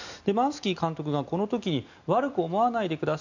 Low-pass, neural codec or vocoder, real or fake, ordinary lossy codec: 7.2 kHz; none; real; none